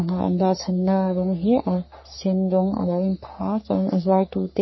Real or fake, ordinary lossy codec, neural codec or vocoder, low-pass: fake; MP3, 24 kbps; codec, 44.1 kHz, 3.4 kbps, Pupu-Codec; 7.2 kHz